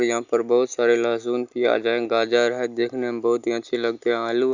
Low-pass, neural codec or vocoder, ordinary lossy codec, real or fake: 7.2 kHz; none; Opus, 64 kbps; real